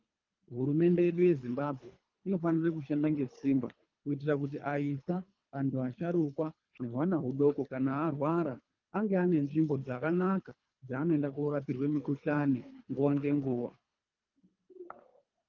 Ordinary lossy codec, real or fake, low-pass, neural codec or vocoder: Opus, 24 kbps; fake; 7.2 kHz; codec, 24 kHz, 3 kbps, HILCodec